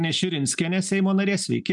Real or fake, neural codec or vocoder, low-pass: real; none; 10.8 kHz